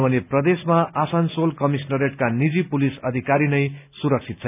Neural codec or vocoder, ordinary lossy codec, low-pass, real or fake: none; none; 3.6 kHz; real